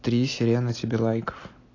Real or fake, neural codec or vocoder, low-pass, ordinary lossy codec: real; none; 7.2 kHz; AAC, 32 kbps